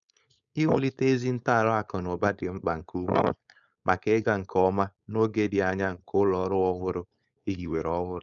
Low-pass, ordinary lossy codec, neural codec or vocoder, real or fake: 7.2 kHz; none; codec, 16 kHz, 4.8 kbps, FACodec; fake